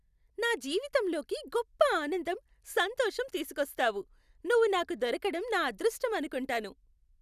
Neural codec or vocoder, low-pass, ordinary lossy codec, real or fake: none; 14.4 kHz; none; real